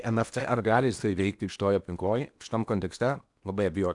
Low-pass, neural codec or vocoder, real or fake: 10.8 kHz; codec, 16 kHz in and 24 kHz out, 0.8 kbps, FocalCodec, streaming, 65536 codes; fake